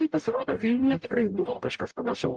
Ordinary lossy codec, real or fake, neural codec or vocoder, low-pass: Opus, 32 kbps; fake; codec, 44.1 kHz, 0.9 kbps, DAC; 9.9 kHz